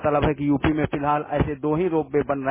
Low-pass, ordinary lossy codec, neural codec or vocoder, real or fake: 3.6 kHz; MP3, 16 kbps; none; real